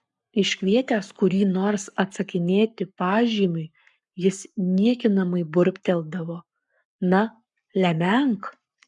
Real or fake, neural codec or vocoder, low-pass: fake; codec, 44.1 kHz, 7.8 kbps, Pupu-Codec; 10.8 kHz